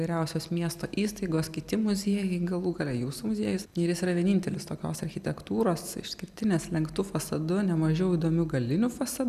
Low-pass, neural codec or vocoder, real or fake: 14.4 kHz; none; real